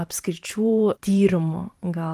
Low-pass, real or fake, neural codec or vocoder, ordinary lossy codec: 14.4 kHz; fake; vocoder, 44.1 kHz, 128 mel bands every 512 samples, BigVGAN v2; Opus, 24 kbps